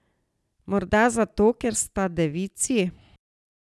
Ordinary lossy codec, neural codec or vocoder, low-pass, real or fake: none; none; none; real